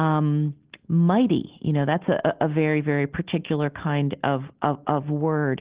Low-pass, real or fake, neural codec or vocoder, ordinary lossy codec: 3.6 kHz; real; none; Opus, 16 kbps